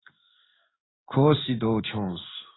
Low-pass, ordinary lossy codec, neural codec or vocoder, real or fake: 7.2 kHz; AAC, 16 kbps; codec, 16 kHz in and 24 kHz out, 1 kbps, XY-Tokenizer; fake